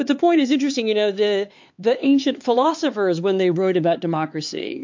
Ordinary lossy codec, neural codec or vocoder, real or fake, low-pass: MP3, 48 kbps; codec, 16 kHz, 4 kbps, X-Codec, HuBERT features, trained on LibriSpeech; fake; 7.2 kHz